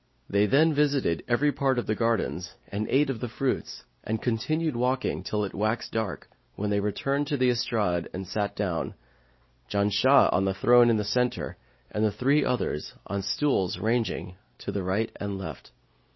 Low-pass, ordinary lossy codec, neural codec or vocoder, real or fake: 7.2 kHz; MP3, 24 kbps; none; real